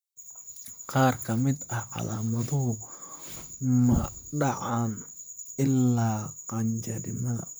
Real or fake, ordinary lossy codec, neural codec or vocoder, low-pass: fake; none; vocoder, 44.1 kHz, 128 mel bands, Pupu-Vocoder; none